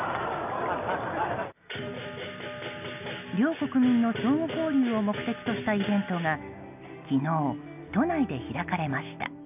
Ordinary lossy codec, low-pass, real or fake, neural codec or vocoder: none; 3.6 kHz; real; none